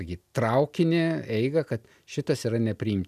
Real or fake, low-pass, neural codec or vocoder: real; 14.4 kHz; none